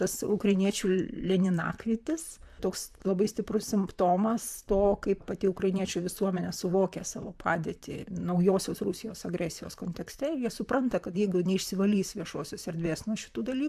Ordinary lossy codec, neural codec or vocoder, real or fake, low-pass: AAC, 96 kbps; vocoder, 44.1 kHz, 128 mel bands, Pupu-Vocoder; fake; 14.4 kHz